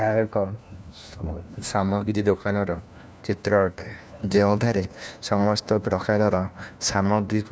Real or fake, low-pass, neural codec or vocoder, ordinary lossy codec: fake; none; codec, 16 kHz, 1 kbps, FunCodec, trained on LibriTTS, 50 frames a second; none